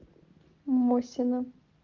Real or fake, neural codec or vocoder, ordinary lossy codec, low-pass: real; none; Opus, 24 kbps; 7.2 kHz